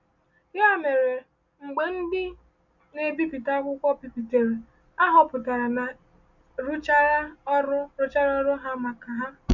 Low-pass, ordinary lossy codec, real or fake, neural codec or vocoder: 7.2 kHz; none; real; none